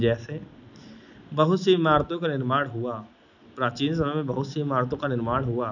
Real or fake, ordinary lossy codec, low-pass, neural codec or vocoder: real; none; 7.2 kHz; none